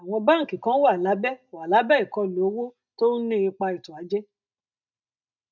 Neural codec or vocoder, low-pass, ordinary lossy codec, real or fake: none; 7.2 kHz; none; real